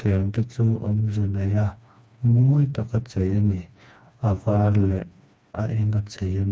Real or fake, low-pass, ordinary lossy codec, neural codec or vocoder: fake; none; none; codec, 16 kHz, 2 kbps, FreqCodec, smaller model